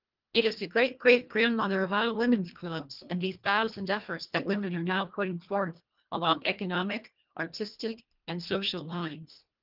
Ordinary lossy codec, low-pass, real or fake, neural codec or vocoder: Opus, 24 kbps; 5.4 kHz; fake; codec, 24 kHz, 1.5 kbps, HILCodec